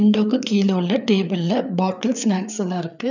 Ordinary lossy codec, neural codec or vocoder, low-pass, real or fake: none; codec, 16 kHz, 4 kbps, FreqCodec, larger model; 7.2 kHz; fake